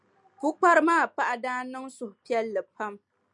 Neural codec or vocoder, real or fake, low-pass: none; real; 10.8 kHz